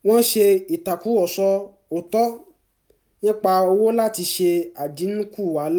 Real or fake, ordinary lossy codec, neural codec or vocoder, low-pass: real; none; none; none